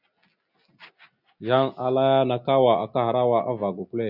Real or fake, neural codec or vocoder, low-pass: real; none; 5.4 kHz